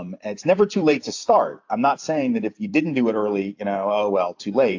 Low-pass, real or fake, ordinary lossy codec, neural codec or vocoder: 7.2 kHz; fake; AAC, 48 kbps; vocoder, 44.1 kHz, 128 mel bands every 256 samples, BigVGAN v2